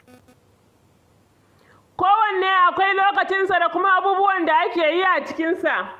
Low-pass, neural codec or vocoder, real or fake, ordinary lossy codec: 14.4 kHz; none; real; Opus, 32 kbps